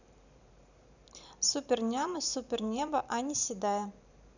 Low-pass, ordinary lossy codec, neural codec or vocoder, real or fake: 7.2 kHz; none; vocoder, 44.1 kHz, 128 mel bands every 512 samples, BigVGAN v2; fake